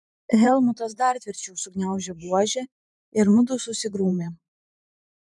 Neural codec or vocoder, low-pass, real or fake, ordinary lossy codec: vocoder, 44.1 kHz, 128 mel bands every 512 samples, BigVGAN v2; 10.8 kHz; fake; MP3, 96 kbps